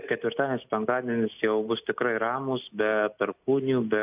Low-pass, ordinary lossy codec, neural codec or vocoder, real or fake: 3.6 kHz; AAC, 32 kbps; none; real